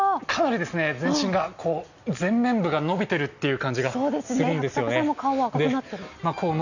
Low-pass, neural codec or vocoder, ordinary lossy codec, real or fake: 7.2 kHz; none; none; real